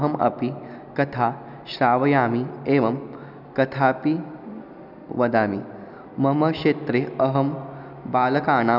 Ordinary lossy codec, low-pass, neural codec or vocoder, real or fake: none; 5.4 kHz; none; real